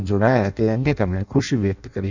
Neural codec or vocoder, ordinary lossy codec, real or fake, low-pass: codec, 16 kHz in and 24 kHz out, 0.6 kbps, FireRedTTS-2 codec; none; fake; 7.2 kHz